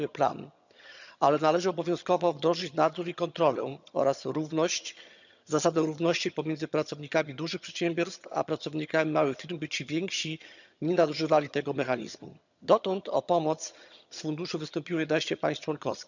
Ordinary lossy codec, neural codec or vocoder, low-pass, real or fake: none; vocoder, 22.05 kHz, 80 mel bands, HiFi-GAN; 7.2 kHz; fake